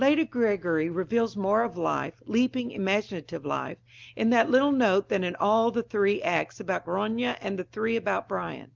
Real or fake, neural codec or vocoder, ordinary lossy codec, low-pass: real; none; Opus, 32 kbps; 7.2 kHz